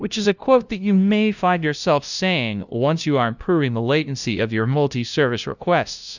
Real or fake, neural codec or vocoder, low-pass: fake; codec, 16 kHz, 0.5 kbps, FunCodec, trained on LibriTTS, 25 frames a second; 7.2 kHz